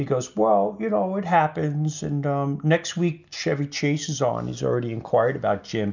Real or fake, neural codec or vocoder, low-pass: real; none; 7.2 kHz